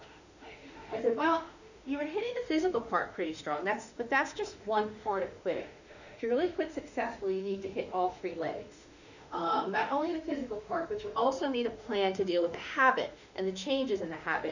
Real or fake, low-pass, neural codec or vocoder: fake; 7.2 kHz; autoencoder, 48 kHz, 32 numbers a frame, DAC-VAE, trained on Japanese speech